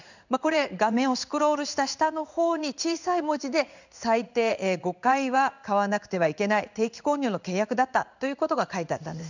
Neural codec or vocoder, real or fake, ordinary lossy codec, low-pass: codec, 16 kHz in and 24 kHz out, 1 kbps, XY-Tokenizer; fake; none; 7.2 kHz